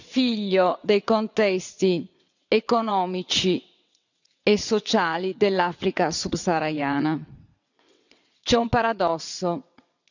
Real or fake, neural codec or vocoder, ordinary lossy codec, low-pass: fake; vocoder, 22.05 kHz, 80 mel bands, WaveNeXt; none; 7.2 kHz